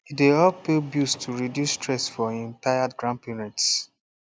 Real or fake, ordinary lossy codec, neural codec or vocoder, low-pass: real; none; none; none